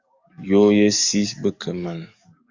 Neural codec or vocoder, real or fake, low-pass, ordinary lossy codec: codec, 44.1 kHz, 7.8 kbps, DAC; fake; 7.2 kHz; Opus, 64 kbps